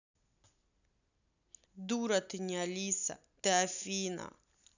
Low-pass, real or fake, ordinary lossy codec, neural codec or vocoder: 7.2 kHz; real; none; none